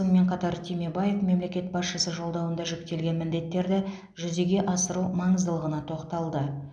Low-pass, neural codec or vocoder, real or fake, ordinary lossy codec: 9.9 kHz; none; real; none